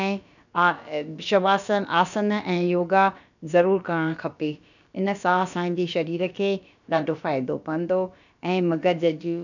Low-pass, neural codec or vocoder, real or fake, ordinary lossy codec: 7.2 kHz; codec, 16 kHz, about 1 kbps, DyCAST, with the encoder's durations; fake; none